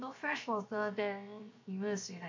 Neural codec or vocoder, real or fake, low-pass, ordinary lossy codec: codec, 16 kHz, 0.7 kbps, FocalCodec; fake; 7.2 kHz; MP3, 48 kbps